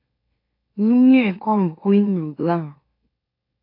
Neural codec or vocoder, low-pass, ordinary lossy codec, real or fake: autoencoder, 44.1 kHz, a latent of 192 numbers a frame, MeloTTS; 5.4 kHz; AAC, 32 kbps; fake